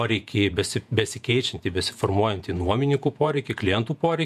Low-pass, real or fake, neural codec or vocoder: 14.4 kHz; fake; vocoder, 48 kHz, 128 mel bands, Vocos